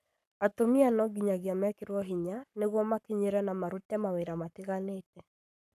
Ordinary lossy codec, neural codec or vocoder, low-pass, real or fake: none; codec, 44.1 kHz, 7.8 kbps, Pupu-Codec; 14.4 kHz; fake